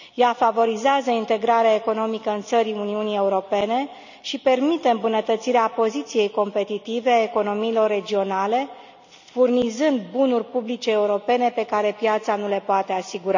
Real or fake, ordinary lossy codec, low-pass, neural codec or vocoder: real; none; 7.2 kHz; none